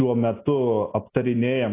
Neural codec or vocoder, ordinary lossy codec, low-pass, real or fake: none; AAC, 24 kbps; 3.6 kHz; real